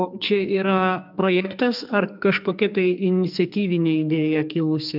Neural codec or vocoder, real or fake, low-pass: codec, 44.1 kHz, 2.6 kbps, SNAC; fake; 5.4 kHz